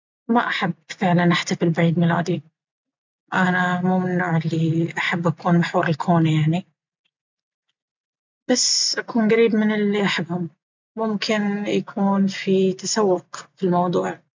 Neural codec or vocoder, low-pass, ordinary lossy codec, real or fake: none; 7.2 kHz; MP3, 64 kbps; real